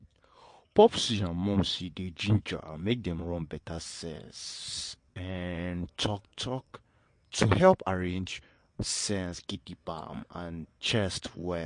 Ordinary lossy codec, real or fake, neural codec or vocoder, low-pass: MP3, 48 kbps; fake; vocoder, 22.05 kHz, 80 mel bands, WaveNeXt; 9.9 kHz